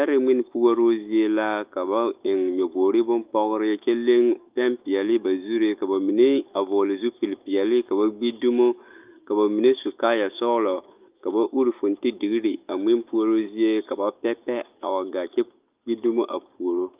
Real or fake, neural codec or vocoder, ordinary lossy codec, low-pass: fake; autoencoder, 48 kHz, 128 numbers a frame, DAC-VAE, trained on Japanese speech; Opus, 24 kbps; 3.6 kHz